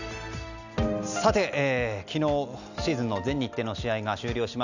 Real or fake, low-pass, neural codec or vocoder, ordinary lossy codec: real; 7.2 kHz; none; none